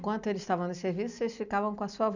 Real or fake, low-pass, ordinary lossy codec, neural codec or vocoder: real; 7.2 kHz; none; none